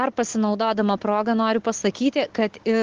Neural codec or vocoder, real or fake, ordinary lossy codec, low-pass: none; real; Opus, 16 kbps; 7.2 kHz